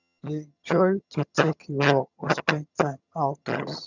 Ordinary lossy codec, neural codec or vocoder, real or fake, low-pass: none; vocoder, 22.05 kHz, 80 mel bands, HiFi-GAN; fake; 7.2 kHz